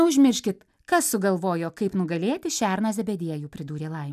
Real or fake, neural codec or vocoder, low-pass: real; none; 14.4 kHz